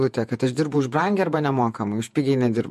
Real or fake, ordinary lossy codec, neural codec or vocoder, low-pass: fake; MP3, 64 kbps; vocoder, 48 kHz, 128 mel bands, Vocos; 14.4 kHz